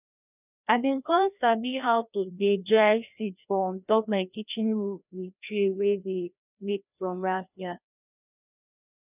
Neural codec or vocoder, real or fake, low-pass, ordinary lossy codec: codec, 16 kHz, 1 kbps, FreqCodec, larger model; fake; 3.6 kHz; none